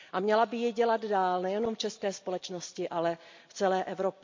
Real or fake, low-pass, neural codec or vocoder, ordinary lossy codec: real; 7.2 kHz; none; MP3, 48 kbps